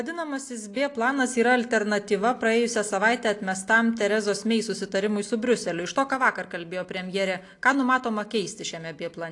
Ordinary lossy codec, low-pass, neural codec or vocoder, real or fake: AAC, 48 kbps; 10.8 kHz; none; real